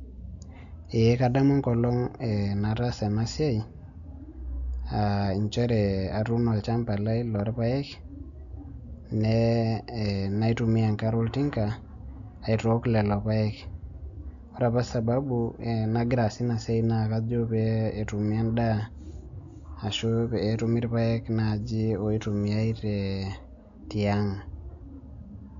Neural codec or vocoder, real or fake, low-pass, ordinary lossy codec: none; real; 7.2 kHz; MP3, 96 kbps